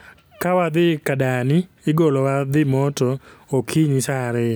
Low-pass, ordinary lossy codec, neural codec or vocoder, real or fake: none; none; none; real